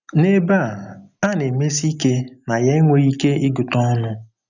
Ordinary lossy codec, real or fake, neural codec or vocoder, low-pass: none; real; none; 7.2 kHz